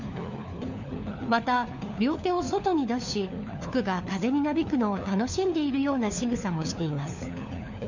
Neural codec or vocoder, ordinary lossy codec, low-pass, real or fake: codec, 16 kHz, 4 kbps, FunCodec, trained on LibriTTS, 50 frames a second; none; 7.2 kHz; fake